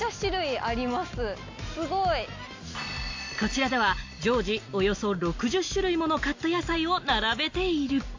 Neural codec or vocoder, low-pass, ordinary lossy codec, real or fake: none; 7.2 kHz; AAC, 48 kbps; real